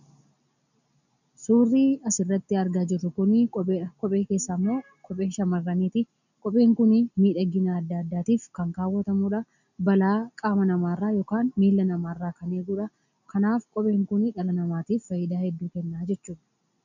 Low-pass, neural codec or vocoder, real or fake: 7.2 kHz; none; real